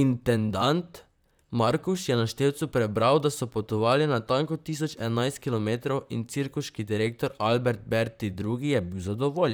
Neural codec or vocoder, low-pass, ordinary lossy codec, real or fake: vocoder, 44.1 kHz, 128 mel bands, Pupu-Vocoder; none; none; fake